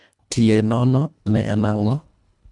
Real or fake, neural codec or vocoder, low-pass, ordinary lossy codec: fake; codec, 24 kHz, 1.5 kbps, HILCodec; 10.8 kHz; none